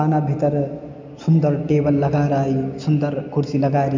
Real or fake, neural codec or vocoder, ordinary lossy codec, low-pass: real; none; MP3, 48 kbps; 7.2 kHz